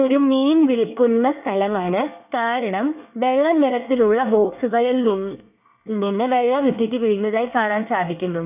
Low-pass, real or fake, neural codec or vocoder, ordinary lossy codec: 3.6 kHz; fake; codec, 24 kHz, 1 kbps, SNAC; none